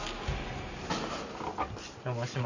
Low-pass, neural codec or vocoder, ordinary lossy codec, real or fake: 7.2 kHz; none; none; real